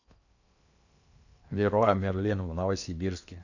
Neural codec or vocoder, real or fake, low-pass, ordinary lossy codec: codec, 16 kHz in and 24 kHz out, 0.8 kbps, FocalCodec, streaming, 65536 codes; fake; 7.2 kHz; none